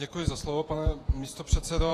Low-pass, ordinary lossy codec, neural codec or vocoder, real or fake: 14.4 kHz; AAC, 48 kbps; vocoder, 48 kHz, 128 mel bands, Vocos; fake